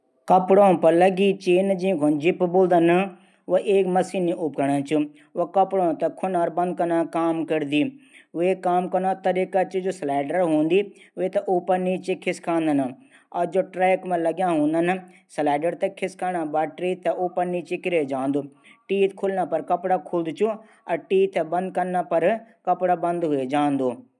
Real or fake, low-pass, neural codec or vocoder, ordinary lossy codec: real; none; none; none